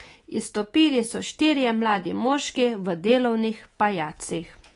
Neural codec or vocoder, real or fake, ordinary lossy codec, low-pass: codec, 24 kHz, 3.1 kbps, DualCodec; fake; AAC, 32 kbps; 10.8 kHz